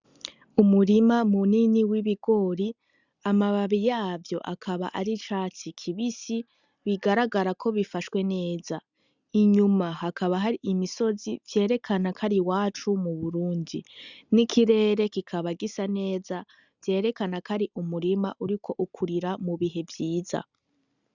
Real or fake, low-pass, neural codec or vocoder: real; 7.2 kHz; none